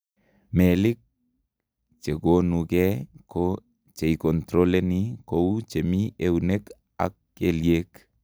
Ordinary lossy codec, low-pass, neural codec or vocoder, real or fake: none; none; none; real